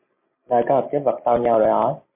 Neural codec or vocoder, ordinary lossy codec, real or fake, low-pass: none; MP3, 32 kbps; real; 3.6 kHz